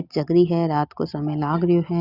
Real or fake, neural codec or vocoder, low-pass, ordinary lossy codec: real; none; 5.4 kHz; Opus, 64 kbps